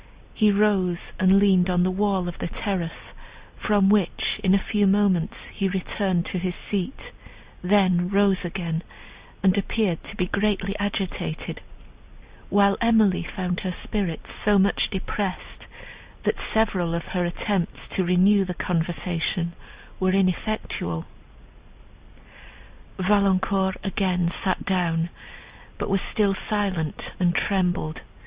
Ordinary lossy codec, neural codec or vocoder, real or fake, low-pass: Opus, 64 kbps; none; real; 3.6 kHz